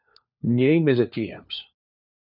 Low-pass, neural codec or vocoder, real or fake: 5.4 kHz; codec, 16 kHz, 4 kbps, FunCodec, trained on LibriTTS, 50 frames a second; fake